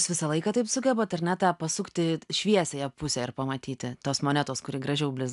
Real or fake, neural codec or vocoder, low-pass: real; none; 10.8 kHz